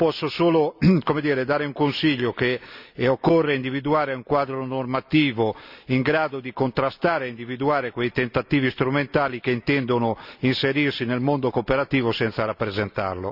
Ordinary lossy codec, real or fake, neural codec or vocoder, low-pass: none; real; none; 5.4 kHz